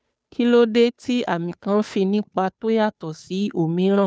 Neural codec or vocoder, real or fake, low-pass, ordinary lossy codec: codec, 16 kHz, 2 kbps, FunCodec, trained on Chinese and English, 25 frames a second; fake; none; none